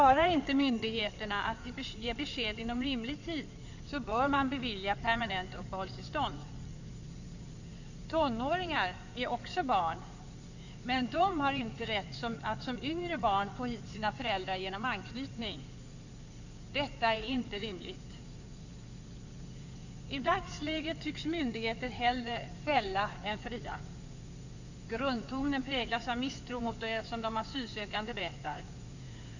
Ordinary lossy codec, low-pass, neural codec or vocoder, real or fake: none; 7.2 kHz; codec, 16 kHz in and 24 kHz out, 2.2 kbps, FireRedTTS-2 codec; fake